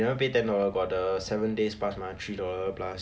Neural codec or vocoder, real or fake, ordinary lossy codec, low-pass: none; real; none; none